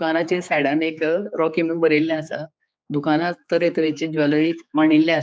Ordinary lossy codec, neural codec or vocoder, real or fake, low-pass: none; codec, 16 kHz, 4 kbps, X-Codec, HuBERT features, trained on general audio; fake; none